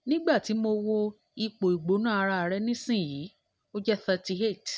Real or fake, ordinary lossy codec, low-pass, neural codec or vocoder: real; none; none; none